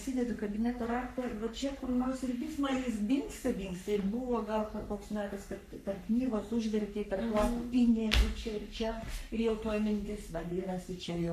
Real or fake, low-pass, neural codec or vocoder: fake; 14.4 kHz; codec, 44.1 kHz, 3.4 kbps, Pupu-Codec